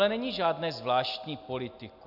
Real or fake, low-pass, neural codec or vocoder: real; 5.4 kHz; none